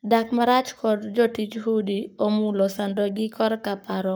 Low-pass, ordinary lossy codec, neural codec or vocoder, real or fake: none; none; codec, 44.1 kHz, 7.8 kbps, Pupu-Codec; fake